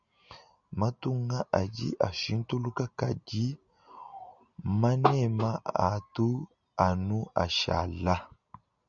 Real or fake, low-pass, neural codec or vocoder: real; 7.2 kHz; none